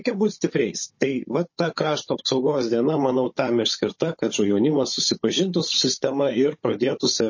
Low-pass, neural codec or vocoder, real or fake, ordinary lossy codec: 7.2 kHz; codec, 16 kHz, 16 kbps, FunCodec, trained on Chinese and English, 50 frames a second; fake; MP3, 32 kbps